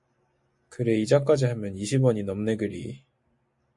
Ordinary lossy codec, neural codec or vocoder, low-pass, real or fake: MP3, 48 kbps; none; 10.8 kHz; real